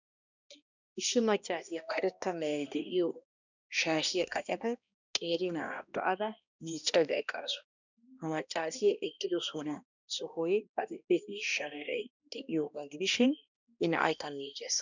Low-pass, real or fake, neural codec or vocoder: 7.2 kHz; fake; codec, 16 kHz, 1 kbps, X-Codec, HuBERT features, trained on balanced general audio